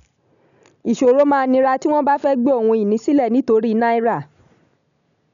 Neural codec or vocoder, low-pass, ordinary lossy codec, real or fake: none; 7.2 kHz; none; real